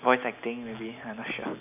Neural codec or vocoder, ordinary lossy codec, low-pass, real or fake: none; AAC, 32 kbps; 3.6 kHz; real